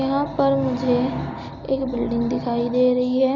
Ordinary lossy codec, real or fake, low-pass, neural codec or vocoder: none; real; 7.2 kHz; none